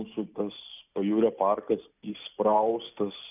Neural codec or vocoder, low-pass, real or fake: none; 3.6 kHz; real